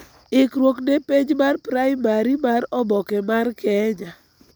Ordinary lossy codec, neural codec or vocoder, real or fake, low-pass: none; none; real; none